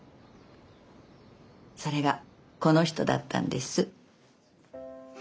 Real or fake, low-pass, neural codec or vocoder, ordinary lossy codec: real; none; none; none